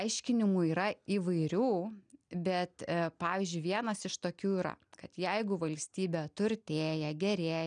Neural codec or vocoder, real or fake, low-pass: none; real; 9.9 kHz